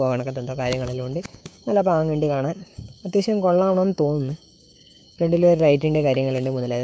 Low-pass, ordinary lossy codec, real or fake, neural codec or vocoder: none; none; fake; codec, 16 kHz, 6 kbps, DAC